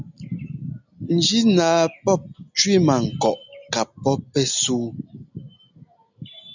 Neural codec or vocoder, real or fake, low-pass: none; real; 7.2 kHz